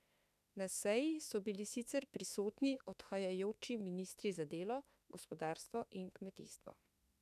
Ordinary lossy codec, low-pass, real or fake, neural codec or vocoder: none; 14.4 kHz; fake; autoencoder, 48 kHz, 32 numbers a frame, DAC-VAE, trained on Japanese speech